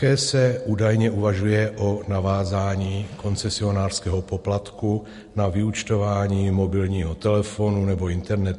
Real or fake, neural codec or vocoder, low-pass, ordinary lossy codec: real; none; 14.4 kHz; MP3, 48 kbps